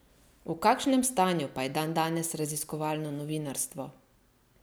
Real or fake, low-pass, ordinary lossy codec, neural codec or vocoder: real; none; none; none